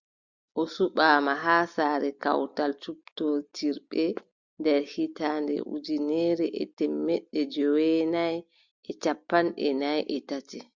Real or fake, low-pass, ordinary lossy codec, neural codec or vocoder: real; 7.2 kHz; Opus, 64 kbps; none